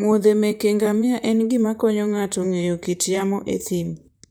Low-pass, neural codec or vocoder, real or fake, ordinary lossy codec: none; vocoder, 44.1 kHz, 128 mel bands, Pupu-Vocoder; fake; none